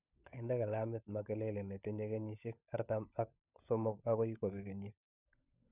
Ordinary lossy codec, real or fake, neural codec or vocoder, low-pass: none; fake; codec, 16 kHz, 16 kbps, FunCodec, trained on LibriTTS, 50 frames a second; 3.6 kHz